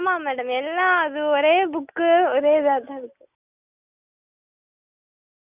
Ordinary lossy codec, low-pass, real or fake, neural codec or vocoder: none; 3.6 kHz; real; none